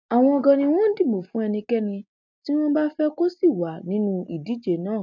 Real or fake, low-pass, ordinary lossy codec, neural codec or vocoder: real; 7.2 kHz; none; none